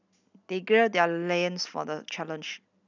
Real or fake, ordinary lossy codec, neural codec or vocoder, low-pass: real; none; none; 7.2 kHz